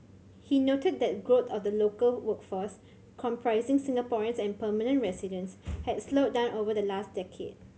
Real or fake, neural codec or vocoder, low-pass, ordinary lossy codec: real; none; none; none